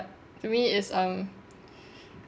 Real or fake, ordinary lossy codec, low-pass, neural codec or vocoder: real; none; none; none